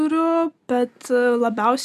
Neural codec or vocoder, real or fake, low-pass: none; real; 14.4 kHz